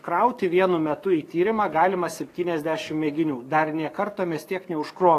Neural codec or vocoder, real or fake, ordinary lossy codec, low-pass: codec, 44.1 kHz, 7.8 kbps, DAC; fake; AAC, 48 kbps; 14.4 kHz